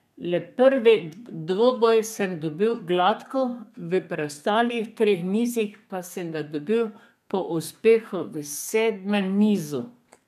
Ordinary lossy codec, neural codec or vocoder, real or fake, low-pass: none; codec, 32 kHz, 1.9 kbps, SNAC; fake; 14.4 kHz